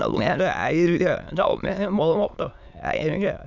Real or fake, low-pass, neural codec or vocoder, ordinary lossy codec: fake; 7.2 kHz; autoencoder, 22.05 kHz, a latent of 192 numbers a frame, VITS, trained on many speakers; none